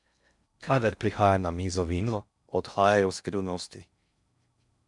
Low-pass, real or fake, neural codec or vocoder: 10.8 kHz; fake; codec, 16 kHz in and 24 kHz out, 0.6 kbps, FocalCodec, streaming, 4096 codes